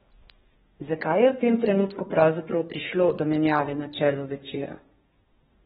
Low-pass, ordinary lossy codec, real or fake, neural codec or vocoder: 14.4 kHz; AAC, 16 kbps; fake; codec, 32 kHz, 1.9 kbps, SNAC